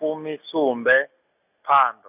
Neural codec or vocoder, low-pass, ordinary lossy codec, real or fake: none; 3.6 kHz; none; real